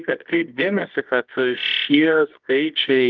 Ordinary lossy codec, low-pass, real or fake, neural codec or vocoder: Opus, 24 kbps; 7.2 kHz; fake; codec, 24 kHz, 0.9 kbps, WavTokenizer, medium music audio release